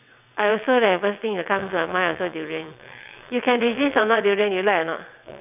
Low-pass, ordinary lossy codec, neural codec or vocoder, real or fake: 3.6 kHz; none; vocoder, 22.05 kHz, 80 mel bands, WaveNeXt; fake